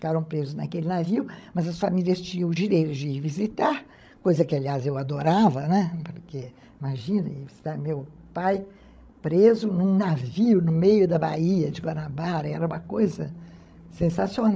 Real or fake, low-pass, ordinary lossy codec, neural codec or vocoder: fake; none; none; codec, 16 kHz, 16 kbps, FreqCodec, larger model